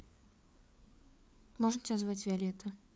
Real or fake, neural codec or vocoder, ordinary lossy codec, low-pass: fake; codec, 16 kHz, 4 kbps, FreqCodec, larger model; none; none